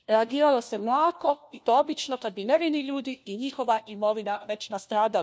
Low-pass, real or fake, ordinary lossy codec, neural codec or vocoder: none; fake; none; codec, 16 kHz, 1 kbps, FunCodec, trained on LibriTTS, 50 frames a second